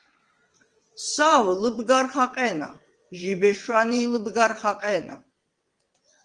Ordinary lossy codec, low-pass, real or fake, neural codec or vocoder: Opus, 24 kbps; 9.9 kHz; fake; vocoder, 22.05 kHz, 80 mel bands, WaveNeXt